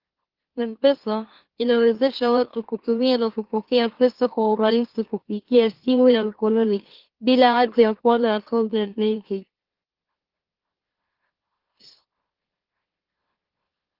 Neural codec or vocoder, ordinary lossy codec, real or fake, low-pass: autoencoder, 44.1 kHz, a latent of 192 numbers a frame, MeloTTS; Opus, 16 kbps; fake; 5.4 kHz